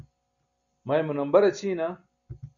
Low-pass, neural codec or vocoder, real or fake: 7.2 kHz; none; real